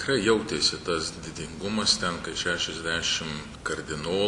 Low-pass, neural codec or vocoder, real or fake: 10.8 kHz; none; real